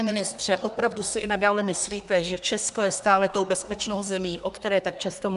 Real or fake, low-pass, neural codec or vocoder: fake; 10.8 kHz; codec, 24 kHz, 1 kbps, SNAC